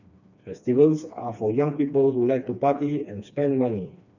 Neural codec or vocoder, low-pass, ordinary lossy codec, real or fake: codec, 16 kHz, 2 kbps, FreqCodec, smaller model; 7.2 kHz; none; fake